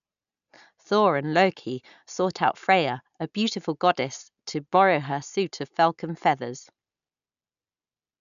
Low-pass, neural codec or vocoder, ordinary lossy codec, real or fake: 7.2 kHz; none; none; real